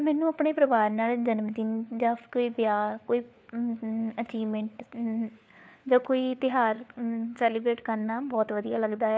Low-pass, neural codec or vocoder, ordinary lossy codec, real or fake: none; codec, 16 kHz, 4 kbps, FunCodec, trained on LibriTTS, 50 frames a second; none; fake